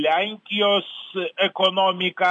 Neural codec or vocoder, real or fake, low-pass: none; real; 9.9 kHz